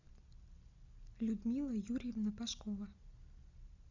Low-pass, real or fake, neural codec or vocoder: 7.2 kHz; real; none